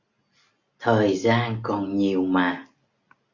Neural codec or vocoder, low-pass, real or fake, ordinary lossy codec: none; 7.2 kHz; real; Opus, 64 kbps